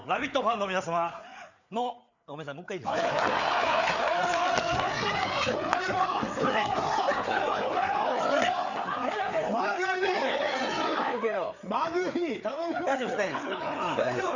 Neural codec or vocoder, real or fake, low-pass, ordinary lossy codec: codec, 16 kHz, 4 kbps, FreqCodec, larger model; fake; 7.2 kHz; none